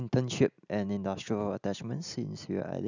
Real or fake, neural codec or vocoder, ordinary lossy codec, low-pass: real; none; none; 7.2 kHz